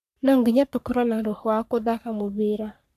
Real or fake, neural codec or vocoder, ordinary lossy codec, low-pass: fake; codec, 44.1 kHz, 3.4 kbps, Pupu-Codec; none; 14.4 kHz